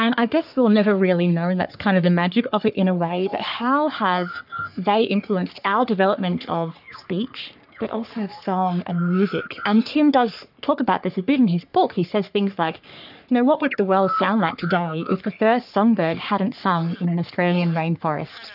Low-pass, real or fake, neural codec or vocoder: 5.4 kHz; fake; codec, 44.1 kHz, 3.4 kbps, Pupu-Codec